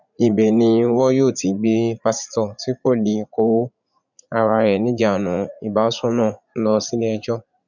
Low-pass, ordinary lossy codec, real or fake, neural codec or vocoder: 7.2 kHz; none; fake; vocoder, 44.1 kHz, 80 mel bands, Vocos